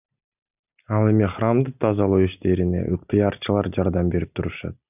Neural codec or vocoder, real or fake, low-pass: none; real; 3.6 kHz